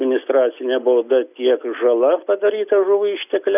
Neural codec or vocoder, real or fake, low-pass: none; real; 3.6 kHz